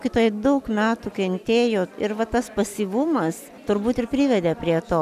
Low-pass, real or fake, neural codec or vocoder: 14.4 kHz; real; none